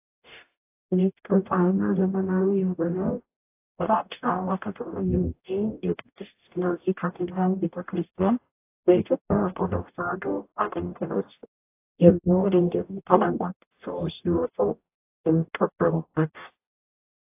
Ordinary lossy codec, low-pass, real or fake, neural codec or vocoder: AAC, 24 kbps; 3.6 kHz; fake; codec, 44.1 kHz, 0.9 kbps, DAC